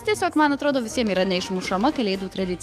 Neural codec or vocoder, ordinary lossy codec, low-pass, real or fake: codec, 44.1 kHz, 7.8 kbps, DAC; AAC, 96 kbps; 14.4 kHz; fake